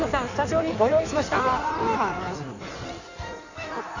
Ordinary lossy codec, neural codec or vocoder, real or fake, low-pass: none; codec, 16 kHz in and 24 kHz out, 1.1 kbps, FireRedTTS-2 codec; fake; 7.2 kHz